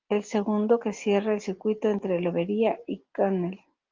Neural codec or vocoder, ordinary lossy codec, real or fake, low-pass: none; Opus, 32 kbps; real; 7.2 kHz